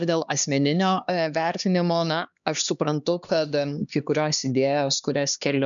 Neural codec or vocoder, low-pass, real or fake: codec, 16 kHz, 2 kbps, X-Codec, HuBERT features, trained on LibriSpeech; 7.2 kHz; fake